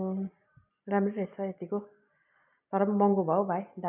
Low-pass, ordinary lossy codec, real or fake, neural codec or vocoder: 3.6 kHz; none; real; none